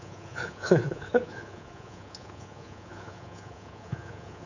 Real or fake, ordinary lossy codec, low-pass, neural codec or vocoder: fake; none; 7.2 kHz; codec, 24 kHz, 3.1 kbps, DualCodec